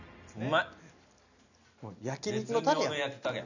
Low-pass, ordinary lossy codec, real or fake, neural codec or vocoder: 7.2 kHz; none; real; none